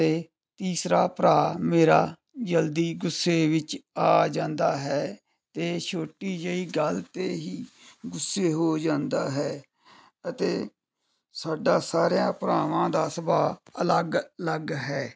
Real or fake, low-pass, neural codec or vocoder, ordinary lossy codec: real; none; none; none